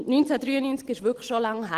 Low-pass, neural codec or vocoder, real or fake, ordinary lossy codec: 14.4 kHz; none; real; Opus, 16 kbps